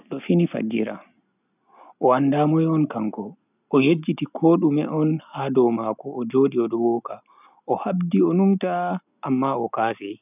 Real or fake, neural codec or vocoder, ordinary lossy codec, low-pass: real; none; none; 3.6 kHz